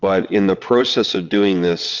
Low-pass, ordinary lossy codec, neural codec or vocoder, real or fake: 7.2 kHz; Opus, 64 kbps; vocoder, 22.05 kHz, 80 mel bands, WaveNeXt; fake